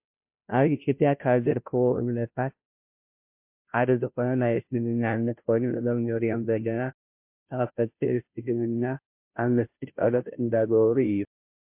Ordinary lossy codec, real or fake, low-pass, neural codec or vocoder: MP3, 32 kbps; fake; 3.6 kHz; codec, 16 kHz, 0.5 kbps, FunCodec, trained on Chinese and English, 25 frames a second